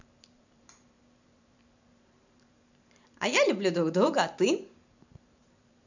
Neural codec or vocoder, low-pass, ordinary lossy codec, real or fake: vocoder, 44.1 kHz, 128 mel bands every 512 samples, BigVGAN v2; 7.2 kHz; none; fake